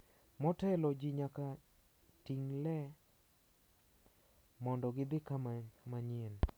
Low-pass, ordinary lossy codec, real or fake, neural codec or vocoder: none; none; real; none